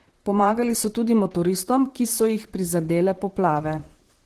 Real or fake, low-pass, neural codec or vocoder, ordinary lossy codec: fake; 14.4 kHz; vocoder, 44.1 kHz, 128 mel bands, Pupu-Vocoder; Opus, 16 kbps